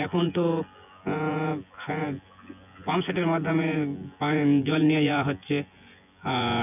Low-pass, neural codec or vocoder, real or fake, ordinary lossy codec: 3.6 kHz; vocoder, 24 kHz, 100 mel bands, Vocos; fake; none